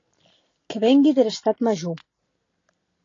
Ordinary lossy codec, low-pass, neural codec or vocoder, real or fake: AAC, 32 kbps; 7.2 kHz; none; real